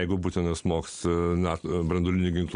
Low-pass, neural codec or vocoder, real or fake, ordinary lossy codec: 9.9 kHz; none; real; MP3, 64 kbps